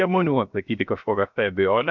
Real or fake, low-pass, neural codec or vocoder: fake; 7.2 kHz; codec, 16 kHz, 0.7 kbps, FocalCodec